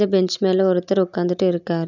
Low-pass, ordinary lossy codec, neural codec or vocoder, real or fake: 7.2 kHz; none; none; real